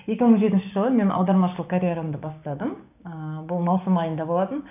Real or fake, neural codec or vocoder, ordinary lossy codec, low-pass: fake; codec, 16 kHz, 6 kbps, DAC; none; 3.6 kHz